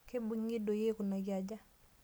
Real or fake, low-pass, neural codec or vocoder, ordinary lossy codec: real; none; none; none